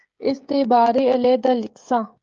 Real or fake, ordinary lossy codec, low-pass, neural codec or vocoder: fake; Opus, 24 kbps; 7.2 kHz; codec, 16 kHz, 16 kbps, FreqCodec, smaller model